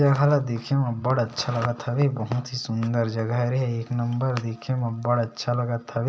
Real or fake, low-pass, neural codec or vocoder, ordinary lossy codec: real; none; none; none